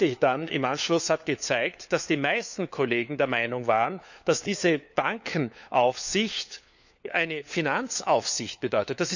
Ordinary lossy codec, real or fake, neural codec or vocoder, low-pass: none; fake; codec, 16 kHz, 4 kbps, FunCodec, trained on LibriTTS, 50 frames a second; 7.2 kHz